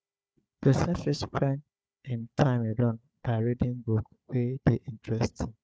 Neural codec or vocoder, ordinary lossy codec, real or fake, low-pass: codec, 16 kHz, 4 kbps, FunCodec, trained on Chinese and English, 50 frames a second; none; fake; none